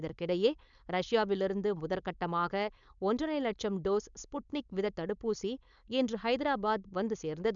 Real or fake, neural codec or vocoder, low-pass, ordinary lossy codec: fake; codec, 16 kHz, 4.8 kbps, FACodec; 7.2 kHz; MP3, 96 kbps